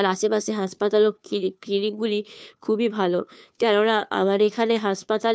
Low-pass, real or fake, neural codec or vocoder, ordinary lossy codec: none; fake; codec, 16 kHz, 2 kbps, FunCodec, trained on Chinese and English, 25 frames a second; none